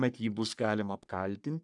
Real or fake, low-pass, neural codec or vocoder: fake; 10.8 kHz; codec, 44.1 kHz, 3.4 kbps, Pupu-Codec